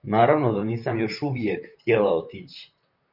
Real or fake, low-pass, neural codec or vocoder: fake; 5.4 kHz; vocoder, 44.1 kHz, 128 mel bands, Pupu-Vocoder